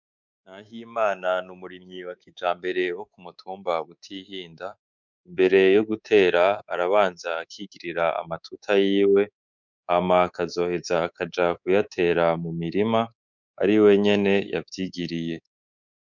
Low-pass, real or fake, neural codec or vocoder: 7.2 kHz; fake; codec, 24 kHz, 3.1 kbps, DualCodec